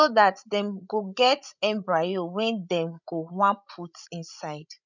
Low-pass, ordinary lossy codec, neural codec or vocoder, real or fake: 7.2 kHz; none; codec, 16 kHz, 16 kbps, FreqCodec, larger model; fake